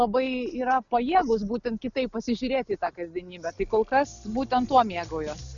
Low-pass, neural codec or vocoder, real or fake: 7.2 kHz; none; real